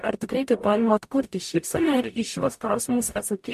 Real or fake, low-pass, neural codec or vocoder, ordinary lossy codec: fake; 14.4 kHz; codec, 44.1 kHz, 0.9 kbps, DAC; MP3, 64 kbps